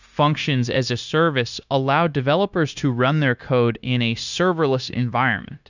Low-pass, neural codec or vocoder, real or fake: 7.2 kHz; codec, 16 kHz, 0.9 kbps, LongCat-Audio-Codec; fake